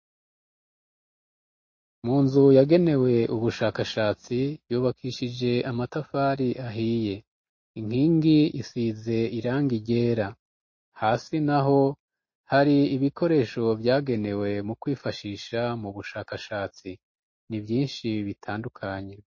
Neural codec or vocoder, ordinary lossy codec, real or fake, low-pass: none; MP3, 32 kbps; real; 7.2 kHz